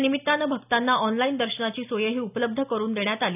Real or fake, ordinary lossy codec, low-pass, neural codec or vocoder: real; none; 3.6 kHz; none